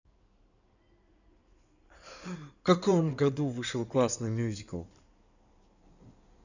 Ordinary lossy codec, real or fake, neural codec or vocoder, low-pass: none; fake; codec, 16 kHz in and 24 kHz out, 2.2 kbps, FireRedTTS-2 codec; 7.2 kHz